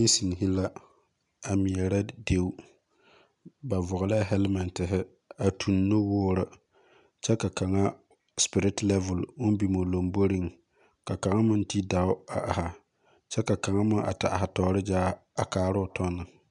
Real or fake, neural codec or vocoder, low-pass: real; none; 10.8 kHz